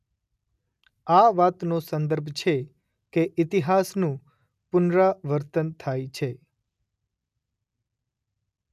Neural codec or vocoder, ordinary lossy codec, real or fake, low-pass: none; none; real; 14.4 kHz